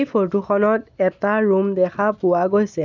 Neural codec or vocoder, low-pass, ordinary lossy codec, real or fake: none; 7.2 kHz; none; real